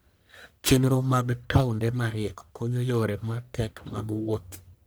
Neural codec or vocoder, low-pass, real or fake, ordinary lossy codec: codec, 44.1 kHz, 1.7 kbps, Pupu-Codec; none; fake; none